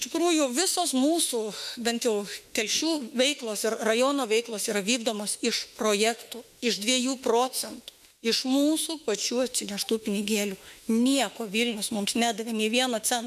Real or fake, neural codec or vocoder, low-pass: fake; autoencoder, 48 kHz, 32 numbers a frame, DAC-VAE, trained on Japanese speech; 14.4 kHz